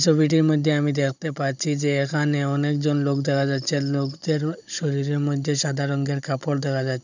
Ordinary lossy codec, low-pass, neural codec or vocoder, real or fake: none; 7.2 kHz; codec, 16 kHz, 16 kbps, FunCodec, trained on Chinese and English, 50 frames a second; fake